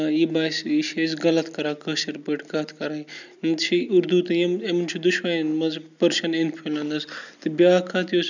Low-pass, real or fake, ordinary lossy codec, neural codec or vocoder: 7.2 kHz; real; none; none